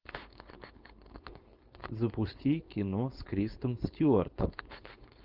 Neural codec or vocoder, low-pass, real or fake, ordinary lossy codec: codec, 16 kHz, 4.8 kbps, FACodec; 5.4 kHz; fake; Opus, 24 kbps